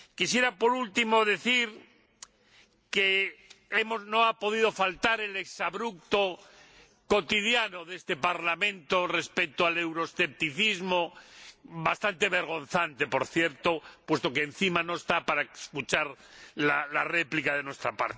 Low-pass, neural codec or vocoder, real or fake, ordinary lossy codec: none; none; real; none